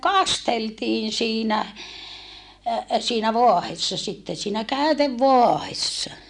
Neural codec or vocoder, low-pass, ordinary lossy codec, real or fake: none; 10.8 kHz; none; real